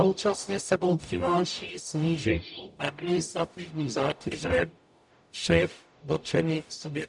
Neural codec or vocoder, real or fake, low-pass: codec, 44.1 kHz, 0.9 kbps, DAC; fake; 10.8 kHz